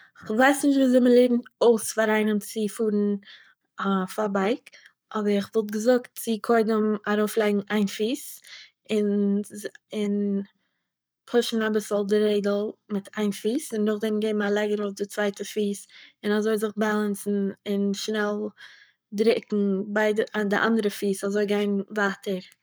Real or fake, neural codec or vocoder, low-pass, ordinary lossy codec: fake; codec, 44.1 kHz, 7.8 kbps, Pupu-Codec; none; none